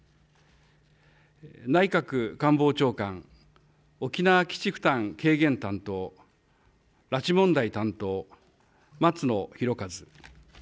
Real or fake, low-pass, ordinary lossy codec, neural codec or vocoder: real; none; none; none